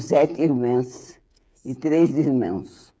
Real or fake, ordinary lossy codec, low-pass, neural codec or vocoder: fake; none; none; codec, 16 kHz, 8 kbps, FreqCodec, smaller model